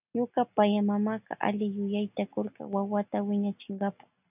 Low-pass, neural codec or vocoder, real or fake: 3.6 kHz; none; real